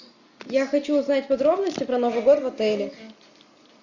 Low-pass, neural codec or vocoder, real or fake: 7.2 kHz; none; real